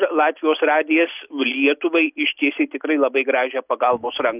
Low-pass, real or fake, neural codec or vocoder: 3.6 kHz; real; none